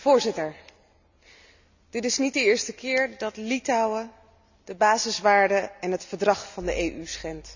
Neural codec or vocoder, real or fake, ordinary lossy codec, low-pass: none; real; none; 7.2 kHz